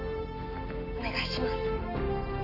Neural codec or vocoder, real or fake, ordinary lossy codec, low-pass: none; real; none; 5.4 kHz